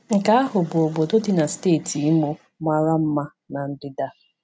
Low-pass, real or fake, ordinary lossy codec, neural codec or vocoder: none; real; none; none